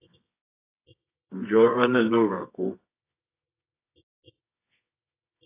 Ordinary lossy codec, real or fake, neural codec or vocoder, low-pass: AAC, 24 kbps; fake; codec, 24 kHz, 0.9 kbps, WavTokenizer, medium music audio release; 3.6 kHz